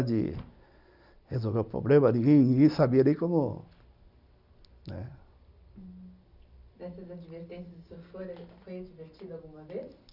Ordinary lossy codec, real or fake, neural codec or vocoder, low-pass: none; real; none; 5.4 kHz